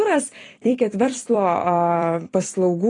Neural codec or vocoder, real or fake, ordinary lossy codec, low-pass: vocoder, 44.1 kHz, 128 mel bands every 256 samples, BigVGAN v2; fake; AAC, 32 kbps; 10.8 kHz